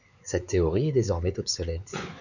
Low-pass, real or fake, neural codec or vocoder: 7.2 kHz; fake; codec, 16 kHz, 4 kbps, X-Codec, WavLM features, trained on Multilingual LibriSpeech